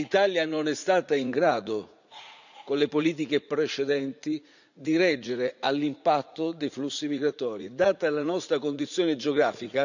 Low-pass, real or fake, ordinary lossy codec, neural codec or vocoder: 7.2 kHz; fake; none; vocoder, 44.1 kHz, 80 mel bands, Vocos